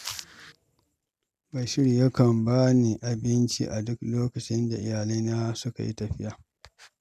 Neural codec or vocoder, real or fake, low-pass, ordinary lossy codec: none; real; 14.4 kHz; none